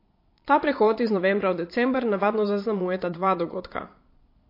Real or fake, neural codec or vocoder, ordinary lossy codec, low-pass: fake; vocoder, 22.05 kHz, 80 mel bands, Vocos; MP3, 32 kbps; 5.4 kHz